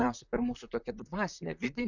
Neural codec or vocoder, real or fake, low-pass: vocoder, 44.1 kHz, 128 mel bands, Pupu-Vocoder; fake; 7.2 kHz